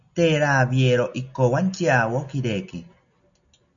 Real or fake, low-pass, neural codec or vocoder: real; 7.2 kHz; none